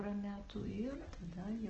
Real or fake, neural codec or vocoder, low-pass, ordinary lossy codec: real; none; 7.2 kHz; Opus, 16 kbps